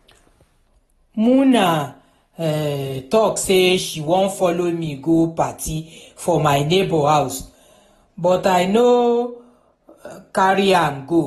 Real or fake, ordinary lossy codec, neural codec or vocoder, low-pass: real; AAC, 32 kbps; none; 19.8 kHz